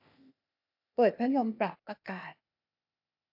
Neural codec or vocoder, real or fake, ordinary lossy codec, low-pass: codec, 16 kHz, 0.8 kbps, ZipCodec; fake; AAC, 48 kbps; 5.4 kHz